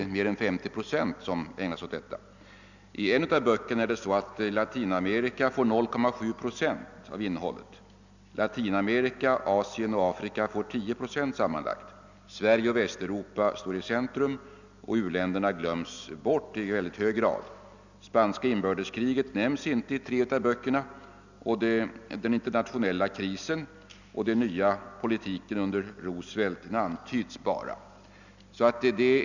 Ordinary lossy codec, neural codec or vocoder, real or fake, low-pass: none; none; real; 7.2 kHz